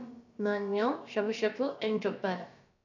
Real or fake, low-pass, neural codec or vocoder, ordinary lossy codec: fake; 7.2 kHz; codec, 16 kHz, about 1 kbps, DyCAST, with the encoder's durations; none